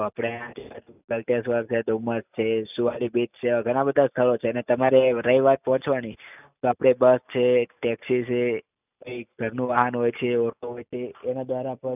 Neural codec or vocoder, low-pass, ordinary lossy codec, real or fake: none; 3.6 kHz; none; real